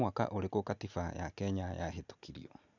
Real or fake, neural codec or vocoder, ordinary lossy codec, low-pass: real; none; none; 7.2 kHz